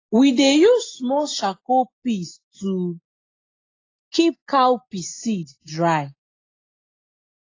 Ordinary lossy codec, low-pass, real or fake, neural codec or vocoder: AAC, 32 kbps; 7.2 kHz; real; none